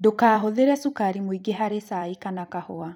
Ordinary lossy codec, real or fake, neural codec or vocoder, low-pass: none; fake; vocoder, 44.1 kHz, 128 mel bands every 256 samples, BigVGAN v2; 19.8 kHz